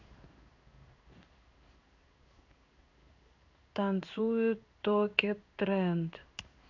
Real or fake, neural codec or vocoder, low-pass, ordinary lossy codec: fake; codec, 16 kHz in and 24 kHz out, 1 kbps, XY-Tokenizer; 7.2 kHz; none